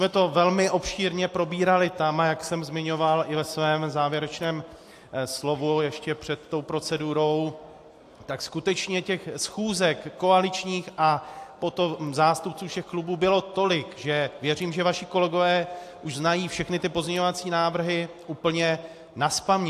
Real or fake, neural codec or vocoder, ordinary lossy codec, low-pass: real; none; AAC, 64 kbps; 14.4 kHz